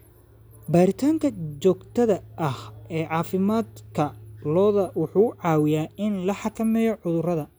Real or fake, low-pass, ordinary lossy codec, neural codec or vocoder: real; none; none; none